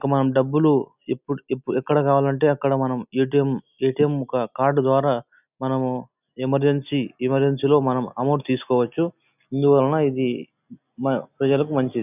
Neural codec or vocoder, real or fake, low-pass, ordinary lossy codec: none; real; 3.6 kHz; none